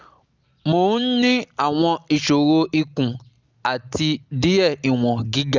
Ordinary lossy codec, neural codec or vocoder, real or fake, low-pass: Opus, 32 kbps; none; real; 7.2 kHz